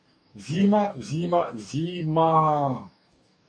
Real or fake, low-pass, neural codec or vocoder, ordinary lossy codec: fake; 9.9 kHz; codec, 44.1 kHz, 2.6 kbps, DAC; MP3, 96 kbps